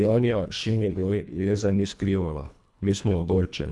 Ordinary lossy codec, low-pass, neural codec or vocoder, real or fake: none; none; codec, 24 kHz, 1.5 kbps, HILCodec; fake